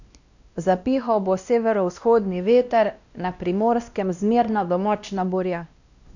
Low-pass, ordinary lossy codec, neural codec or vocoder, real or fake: 7.2 kHz; none; codec, 16 kHz, 1 kbps, X-Codec, WavLM features, trained on Multilingual LibriSpeech; fake